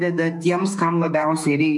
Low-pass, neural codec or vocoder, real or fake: 10.8 kHz; autoencoder, 48 kHz, 32 numbers a frame, DAC-VAE, trained on Japanese speech; fake